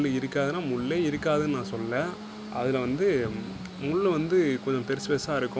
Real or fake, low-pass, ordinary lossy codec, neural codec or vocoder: real; none; none; none